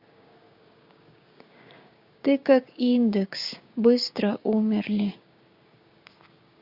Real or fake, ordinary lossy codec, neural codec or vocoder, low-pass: fake; Opus, 64 kbps; codec, 16 kHz, 6 kbps, DAC; 5.4 kHz